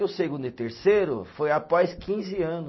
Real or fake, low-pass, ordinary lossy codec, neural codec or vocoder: real; 7.2 kHz; MP3, 24 kbps; none